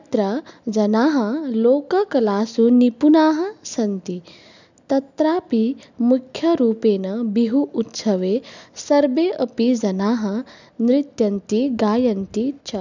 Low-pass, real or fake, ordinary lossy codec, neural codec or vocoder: 7.2 kHz; real; none; none